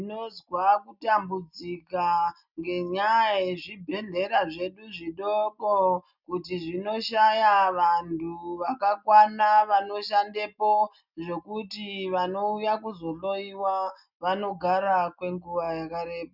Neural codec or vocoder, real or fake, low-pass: none; real; 5.4 kHz